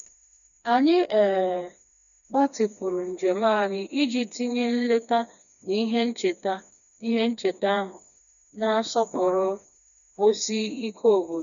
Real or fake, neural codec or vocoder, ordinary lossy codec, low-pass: fake; codec, 16 kHz, 2 kbps, FreqCodec, smaller model; none; 7.2 kHz